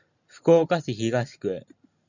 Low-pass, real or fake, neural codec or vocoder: 7.2 kHz; real; none